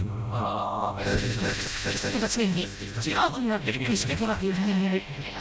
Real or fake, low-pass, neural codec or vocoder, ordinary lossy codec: fake; none; codec, 16 kHz, 0.5 kbps, FreqCodec, smaller model; none